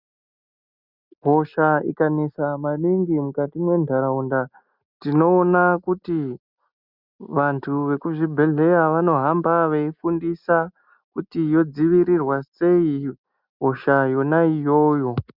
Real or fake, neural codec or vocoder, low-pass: real; none; 5.4 kHz